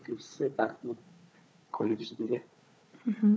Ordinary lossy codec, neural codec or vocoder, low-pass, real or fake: none; codec, 16 kHz, 4 kbps, FunCodec, trained on Chinese and English, 50 frames a second; none; fake